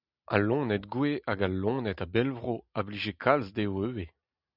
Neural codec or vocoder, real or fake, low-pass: none; real; 5.4 kHz